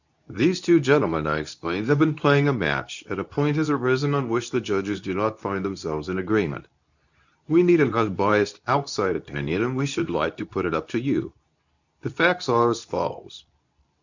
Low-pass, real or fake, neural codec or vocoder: 7.2 kHz; fake; codec, 24 kHz, 0.9 kbps, WavTokenizer, medium speech release version 2